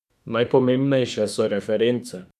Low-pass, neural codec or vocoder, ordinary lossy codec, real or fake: 14.4 kHz; autoencoder, 48 kHz, 32 numbers a frame, DAC-VAE, trained on Japanese speech; none; fake